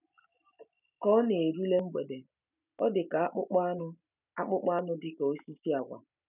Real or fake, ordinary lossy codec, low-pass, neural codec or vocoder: real; none; 3.6 kHz; none